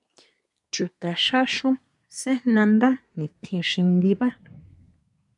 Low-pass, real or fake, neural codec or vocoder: 10.8 kHz; fake; codec, 24 kHz, 1 kbps, SNAC